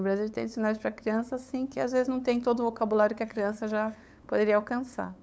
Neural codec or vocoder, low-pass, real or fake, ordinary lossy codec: codec, 16 kHz, 8 kbps, FunCodec, trained on LibriTTS, 25 frames a second; none; fake; none